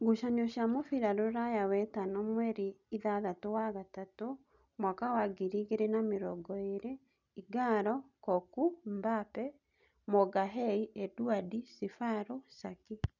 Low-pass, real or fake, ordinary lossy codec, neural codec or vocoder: 7.2 kHz; real; none; none